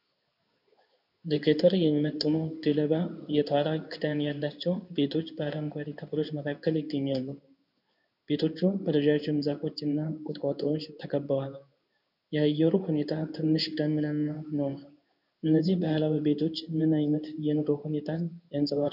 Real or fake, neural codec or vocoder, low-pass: fake; codec, 16 kHz in and 24 kHz out, 1 kbps, XY-Tokenizer; 5.4 kHz